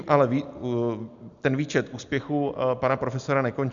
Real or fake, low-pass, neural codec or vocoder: real; 7.2 kHz; none